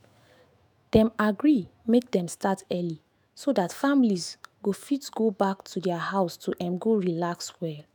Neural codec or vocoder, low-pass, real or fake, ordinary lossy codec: autoencoder, 48 kHz, 128 numbers a frame, DAC-VAE, trained on Japanese speech; none; fake; none